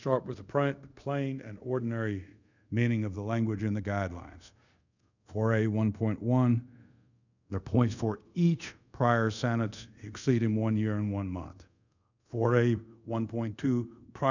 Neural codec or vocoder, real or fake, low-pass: codec, 24 kHz, 0.5 kbps, DualCodec; fake; 7.2 kHz